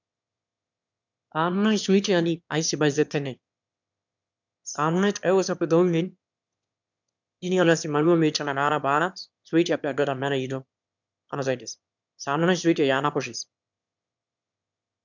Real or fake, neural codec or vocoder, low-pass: fake; autoencoder, 22.05 kHz, a latent of 192 numbers a frame, VITS, trained on one speaker; 7.2 kHz